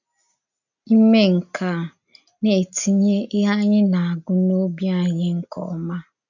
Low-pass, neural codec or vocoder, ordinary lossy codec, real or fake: 7.2 kHz; none; none; real